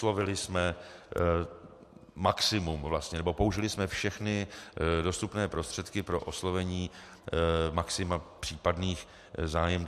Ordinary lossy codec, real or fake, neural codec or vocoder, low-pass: MP3, 64 kbps; real; none; 14.4 kHz